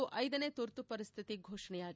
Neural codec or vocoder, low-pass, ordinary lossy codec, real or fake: none; none; none; real